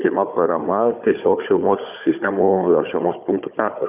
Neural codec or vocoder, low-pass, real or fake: codec, 16 kHz, 4 kbps, FunCodec, trained on Chinese and English, 50 frames a second; 3.6 kHz; fake